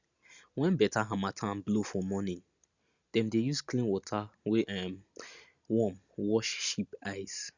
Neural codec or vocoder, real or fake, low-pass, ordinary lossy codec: none; real; 7.2 kHz; Opus, 64 kbps